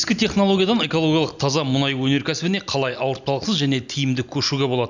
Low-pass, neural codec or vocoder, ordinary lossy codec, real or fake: 7.2 kHz; none; none; real